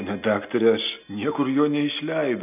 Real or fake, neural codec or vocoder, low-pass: real; none; 3.6 kHz